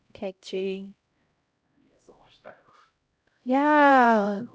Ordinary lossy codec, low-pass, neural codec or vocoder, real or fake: none; none; codec, 16 kHz, 0.5 kbps, X-Codec, HuBERT features, trained on LibriSpeech; fake